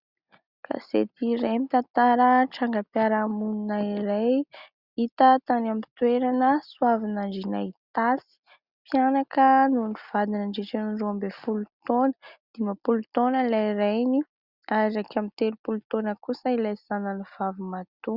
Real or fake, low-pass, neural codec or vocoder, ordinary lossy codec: real; 5.4 kHz; none; Opus, 64 kbps